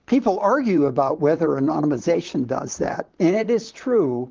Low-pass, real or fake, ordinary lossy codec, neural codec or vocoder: 7.2 kHz; fake; Opus, 32 kbps; vocoder, 44.1 kHz, 128 mel bands, Pupu-Vocoder